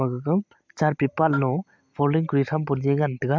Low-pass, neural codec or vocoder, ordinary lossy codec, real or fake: 7.2 kHz; none; MP3, 64 kbps; real